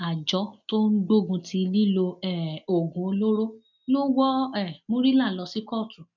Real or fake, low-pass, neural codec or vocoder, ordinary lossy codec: real; 7.2 kHz; none; none